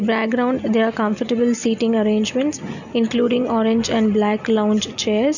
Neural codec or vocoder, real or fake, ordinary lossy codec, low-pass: none; real; none; 7.2 kHz